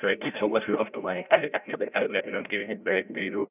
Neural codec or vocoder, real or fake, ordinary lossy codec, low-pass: codec, 16 kHz, 0.5 kbps, FreqCodec, larger model; fake; none; 3.6 kHz